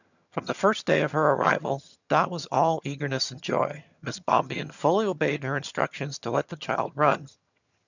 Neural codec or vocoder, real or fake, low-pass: vocoder, 22.05 kHz, 80 mel bands, HiFi-GAN; fake; 7.2 kHz